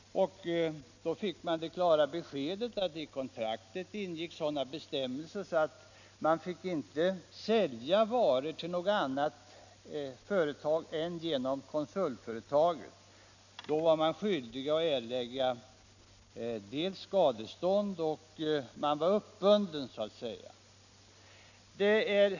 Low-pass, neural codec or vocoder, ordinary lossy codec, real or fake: 7.2 kHz; none; none; real